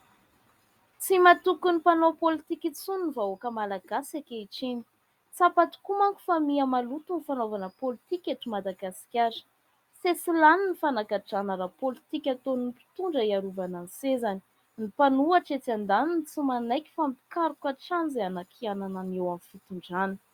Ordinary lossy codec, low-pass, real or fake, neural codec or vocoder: Opus, 64 kbps; 19.8 kHz; real; none